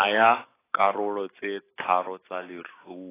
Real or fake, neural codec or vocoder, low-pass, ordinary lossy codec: real; none; 3.6 kHz; AAC, 16 kbps